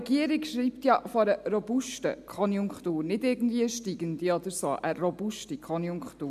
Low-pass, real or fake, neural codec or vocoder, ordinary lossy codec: 14.4 kHz; real; none; MP3, 96 kbps